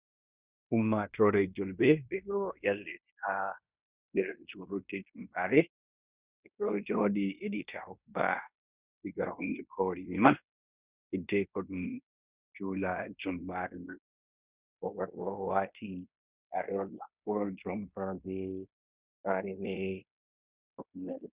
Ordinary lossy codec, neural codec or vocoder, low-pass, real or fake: Opus, 64 kbps; codec, 16 kHz in and 24 kHz out, 0.9 kbps, LongCat-Audio-Codec, fine tuned four codebook decoder; 3.6 kHz; fake